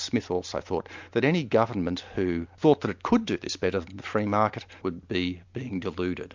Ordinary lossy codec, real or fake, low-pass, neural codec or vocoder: MP3, 64 kbps; real; 7.2 kHz; none